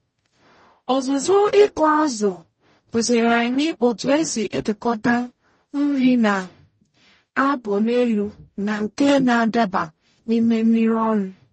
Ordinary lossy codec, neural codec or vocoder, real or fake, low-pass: MP3, 32 kbps; codec, 44.1 kHz, 0.9 kbps, DAC; fake; 10.8 kHz